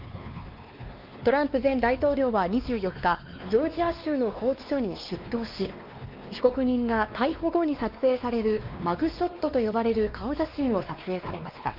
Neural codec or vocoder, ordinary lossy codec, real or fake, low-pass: codec, 16 kHz, 2 kbps, X-Codec, WavLM features, trained on Multilingual LibriSpeech; Opus, 24 kbps; fake; 5.4 kHz